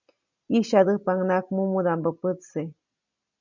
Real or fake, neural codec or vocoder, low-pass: real; none; 7.2 kHz